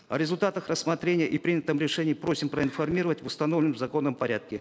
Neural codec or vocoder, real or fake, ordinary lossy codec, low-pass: none; real; none; none